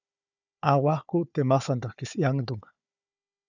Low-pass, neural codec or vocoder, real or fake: 7.2 kHz; codec, 16 kHz, 4 kbps, FunCodec, trained on Chinese and English, 50 frames a second; fake